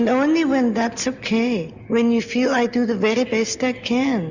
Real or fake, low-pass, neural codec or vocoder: real; 7.2 kHz; none